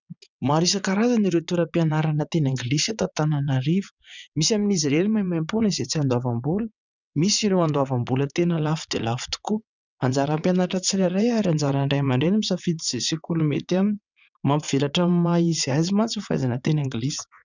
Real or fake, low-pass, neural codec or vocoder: fake; 7.2 kHz; vocoder, 22.05 kHz, 80 mel bands, WaveNeXt